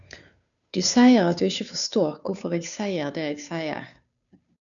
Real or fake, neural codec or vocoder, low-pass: fake; codec, 16 kHz, 2 kbps, FunCodec, trained on Chinese and English, 25 frames a second; 7.2 kHz